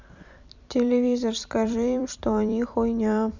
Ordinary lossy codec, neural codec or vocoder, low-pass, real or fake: none; vocoder, 44.1 kHz, 128 mel bands every 256 samples, BigVGAN v2; 7.2 kHz; fake